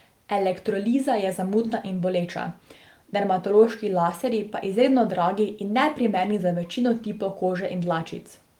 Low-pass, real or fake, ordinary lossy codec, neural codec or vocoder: 19.8 kHz; real; Opus, 24 kbps; none